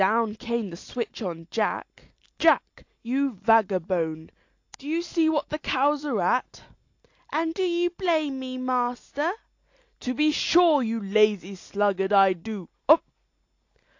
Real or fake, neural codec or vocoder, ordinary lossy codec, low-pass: real; none; AAC, 48 kbps; 7.2 kHz